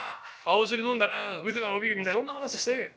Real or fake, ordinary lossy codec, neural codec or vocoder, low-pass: fake; none; codec, 16 kHz, about 1 kbps, DyCAST, with the encoder's durations; none